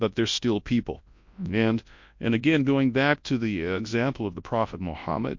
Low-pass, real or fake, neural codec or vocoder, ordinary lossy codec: 7.2 kHz; fake; codec, 24 kHz, 0.9 kbps, WavTokenizer, large speech release; MP3, 48 kbps